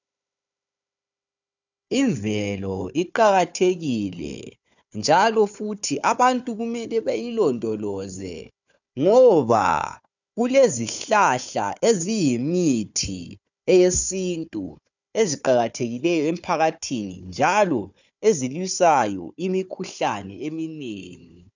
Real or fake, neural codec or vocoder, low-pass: fake; codec, 16 kHz, 4 kbps, FunCodec, trained on Chinese and English, 50 frames a second; 7.2 kHz